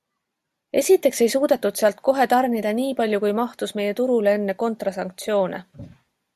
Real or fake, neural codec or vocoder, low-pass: real; none; 14.4 kHz